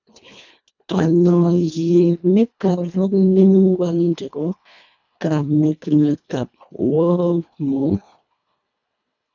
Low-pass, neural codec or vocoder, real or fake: 7.2 kHz; codec, 24 kHz, 1.5 kbps, HILCodec; fake